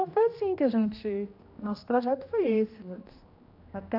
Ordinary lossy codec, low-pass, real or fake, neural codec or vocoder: AAC, 48 kbps; 5.4 kHz; fake; codec, 16 kHz, 1 kbps, X-Codec, HuBERT features, trained on general audio